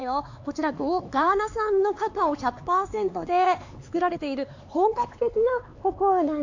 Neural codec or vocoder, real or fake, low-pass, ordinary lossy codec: codec, 16 kHz, 4 kbps, X-Codec, HuBERT features, trained on LibriSpeech; fake; 7.2 kHz; AAC, 48 kbps